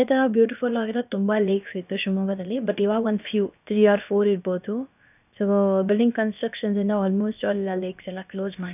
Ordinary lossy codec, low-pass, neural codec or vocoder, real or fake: none; 3.6 kHz; codec, 16 kHz, about 1 kbps, DyCAST, with the encoder's durations; fake